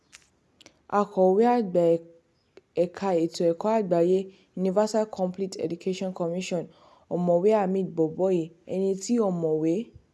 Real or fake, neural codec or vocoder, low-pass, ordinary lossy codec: real; none; none; none